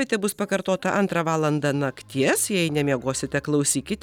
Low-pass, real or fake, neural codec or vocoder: 19.8 kHz; real; none